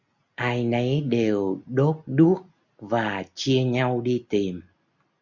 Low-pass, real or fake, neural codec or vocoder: 7.2 kHz; real; none